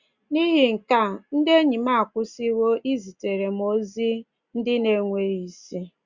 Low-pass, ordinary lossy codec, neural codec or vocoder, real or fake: 7.2 kHz; Opus, 64 kbps; none; real